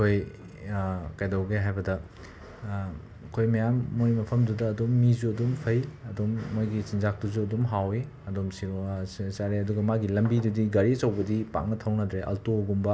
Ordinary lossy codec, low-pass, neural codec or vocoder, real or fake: none; none; none; real